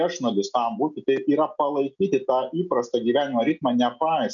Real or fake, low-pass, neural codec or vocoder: real; 7.2 kHz; none